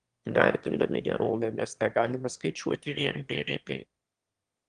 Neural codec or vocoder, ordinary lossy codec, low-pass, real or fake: autoencoder, 22.05 kHz, a latent of 192 numbers a frame, VITS, trained on one speaker; Opus, 24 kbps; 9.9 kHz; fake